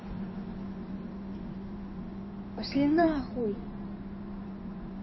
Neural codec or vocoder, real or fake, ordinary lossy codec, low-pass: codec, 16 kHz in and 24 kHz out, 2.2 kbps, FireRedTTS-2 codec; fake; MP3, 24 kbps; 7.2 kHz